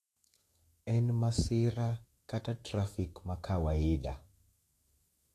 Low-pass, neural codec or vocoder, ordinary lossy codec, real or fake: 14.4 kHz; autoencoder, 48 kHz, 128 numbers a frame, DAC-VAE, trained on Japanese speech; AAC, 48 kbps; fake